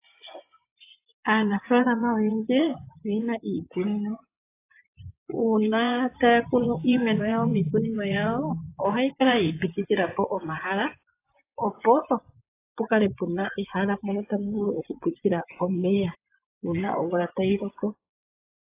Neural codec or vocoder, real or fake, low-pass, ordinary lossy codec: vocoder, 24 kHz, 100 mel bands, Vocos; fake; 3.6 kHz; AAC, 24 kbps